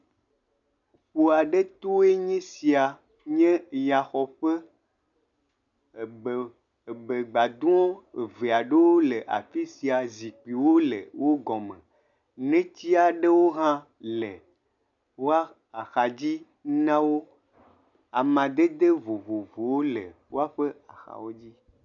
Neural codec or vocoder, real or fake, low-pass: none; real; 7.2 kHz